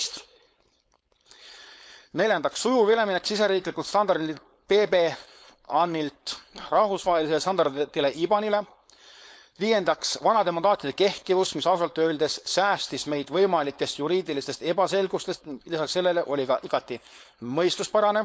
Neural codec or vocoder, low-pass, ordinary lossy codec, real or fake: codec, 16 kHz, 4.8 kbps, FACodec; none; none; fake